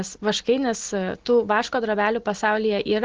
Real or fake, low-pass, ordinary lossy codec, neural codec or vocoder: real; 7.2 kHz; Opus, 16 kbps; none